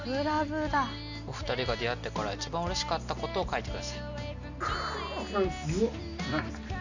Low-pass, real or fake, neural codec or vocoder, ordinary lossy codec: 7.2 kHz; real; none; none